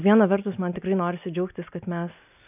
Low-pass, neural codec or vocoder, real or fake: 3.6 kHz; none; real